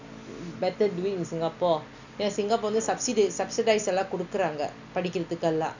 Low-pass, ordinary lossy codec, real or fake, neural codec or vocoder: 7.2 kHz; none; real; none